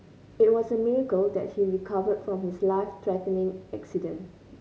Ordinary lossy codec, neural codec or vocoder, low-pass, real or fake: none; none; none; real